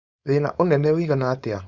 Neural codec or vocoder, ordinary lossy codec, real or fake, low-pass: codec, 16 kHz, 4.8 kbps, FACodec; Opus, 64 kbps; fake; 7.2 kHz